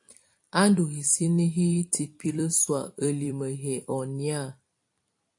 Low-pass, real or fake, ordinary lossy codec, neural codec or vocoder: 10.8 kHz; fake; AAC, 64 kbps; vocoder, 44.1 kHz, 128 mel bands every 256 samples, BigVGAN v2